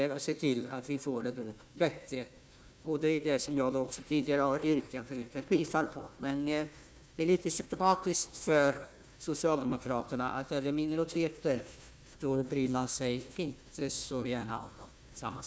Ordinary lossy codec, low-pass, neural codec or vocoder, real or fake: none; none; codec, 16 kHz, 1 kbps, FunCodec, trained on Chinese and English, 50 frames a second; fake